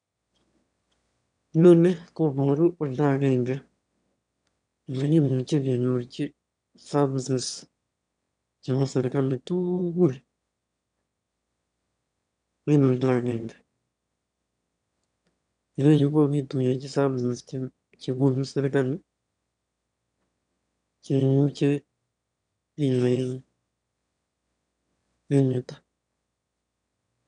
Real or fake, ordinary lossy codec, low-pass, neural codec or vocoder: fake; none; 9.9 kHz; autoencoder, 22.05 kHz, a latent of 192 numbers a frame, VITS, trained on one speaker